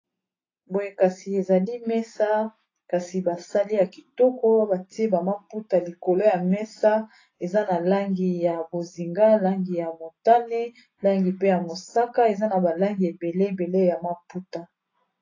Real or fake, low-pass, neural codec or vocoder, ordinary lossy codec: real; 7.2 kHz; none; AAC, 32 kbps